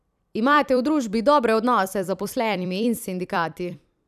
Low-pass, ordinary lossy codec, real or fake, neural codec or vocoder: 14.4 kHz; none; fake; vocoder, 44.1 kHz, 128 mel bands every 256 samples, BigVGAN v2